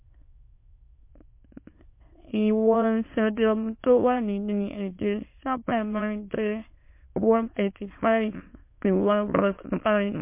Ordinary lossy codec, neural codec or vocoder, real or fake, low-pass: MP3, 24 kbps; autoencoder, 22.05 kHz, a latent of 192 numbers a frame, VITS, trained on many speakers; fake; 3.6 kHz